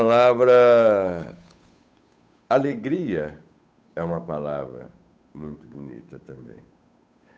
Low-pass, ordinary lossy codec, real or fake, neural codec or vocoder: none; none; fake; codec, 16 kHz, 8 kbps, FunCodec, trained on Chinese and English, 25 frames a second